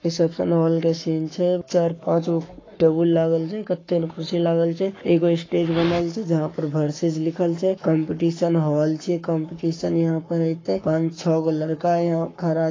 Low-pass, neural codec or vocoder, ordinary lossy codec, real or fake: 7.2 kHz; codec, 44.1 kHz, 7.8 kbps, Pupu-Codec; AAC, 32 kbps; fake